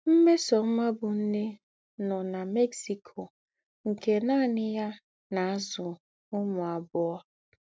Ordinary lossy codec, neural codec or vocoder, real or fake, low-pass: none; none; real; none